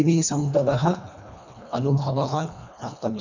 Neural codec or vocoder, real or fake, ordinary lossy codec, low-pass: codec, 24 kHz, 1.5 kbps, HILCodec; fake; none; 7.2 kHz